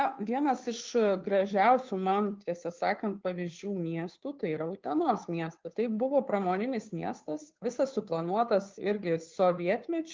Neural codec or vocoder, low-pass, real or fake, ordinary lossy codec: codec, 16 kHz, 2 kbps, FunCodec, trained on Chinese and English, 25 frames a second; 7.2 kHz; fake; Opus, 32 kbps